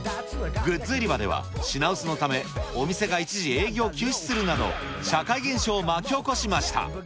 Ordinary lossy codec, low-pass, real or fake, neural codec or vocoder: none; none; real; none